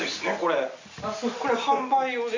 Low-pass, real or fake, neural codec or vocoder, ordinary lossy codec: 7.2 kHz; real; none; MP3, 64 kbps